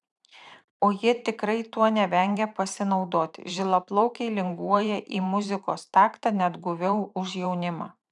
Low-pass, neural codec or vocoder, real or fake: 10.8 kHz; vocoder, 44.1 kHz, 128 mel bands every 512 samples, BigVGAN v2; fake